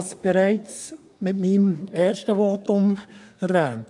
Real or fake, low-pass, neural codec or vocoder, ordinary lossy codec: fake; 10.8 kHz; codec, 24 kHz, 1 kbps, SNAC; none